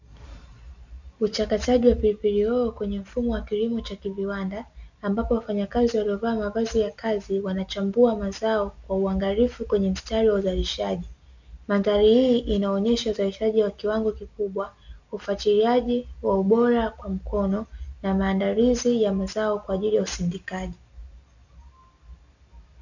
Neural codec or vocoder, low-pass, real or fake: none; 7.2 kHz; real